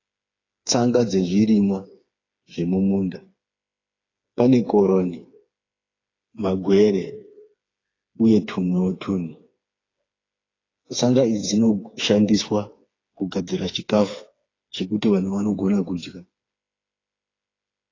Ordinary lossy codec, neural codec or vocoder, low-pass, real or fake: AAC, 32 kbps; codec, 16 kHz, 4 kbps, FreqCodec, smaller model; 7.2 kHz; fake